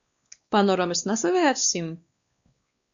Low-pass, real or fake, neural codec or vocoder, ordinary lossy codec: 7.2 kHz; fake; codec, 16 kHz, 2 kbps, X-Codec, WavLM features, trained on Multilingual LibriSpeech; Opus, 64 kbps